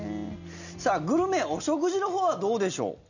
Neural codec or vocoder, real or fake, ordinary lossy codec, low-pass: none; real; none; 7.2 kHz